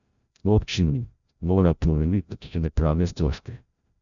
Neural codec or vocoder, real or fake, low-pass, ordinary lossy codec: codec, 16 kHz, 0.5 kbps, FreqCodec, larger model; fake; 7.2 kHz; none